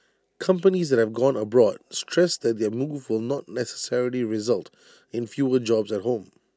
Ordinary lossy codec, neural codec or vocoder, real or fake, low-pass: none; none; real; none